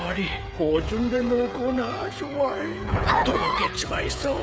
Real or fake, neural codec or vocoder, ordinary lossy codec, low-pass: fake; codec, 16 kHz, 16 kbps, FreqCodec, smaller model; none; none